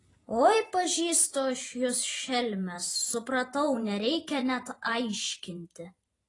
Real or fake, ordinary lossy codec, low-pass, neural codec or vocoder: fake; AAC, 32 kbps; 10.8 kHz; vocoder, 44.1 kHz, 128 mel bands every 512 samples, BigVGAN v2